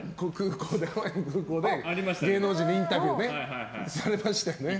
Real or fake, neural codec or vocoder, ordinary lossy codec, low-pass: real; none; none; none